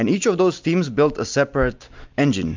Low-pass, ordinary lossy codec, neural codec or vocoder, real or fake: 7.2 kHz; MP3, 64 kbps; none; real